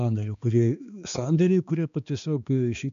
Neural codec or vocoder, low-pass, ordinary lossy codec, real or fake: codec, 16 kHz, 2 kbps, X-Codec, HuBERT features, trained on balanced general audio; 7.2 kHz; MP3, 64 kbps; fake